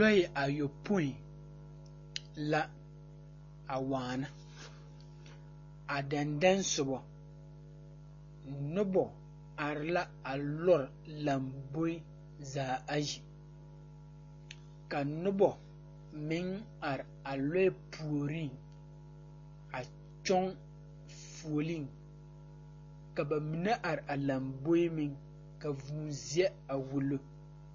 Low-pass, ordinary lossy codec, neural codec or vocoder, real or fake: 7.2 kHz; MP3, 32 kbps; none; real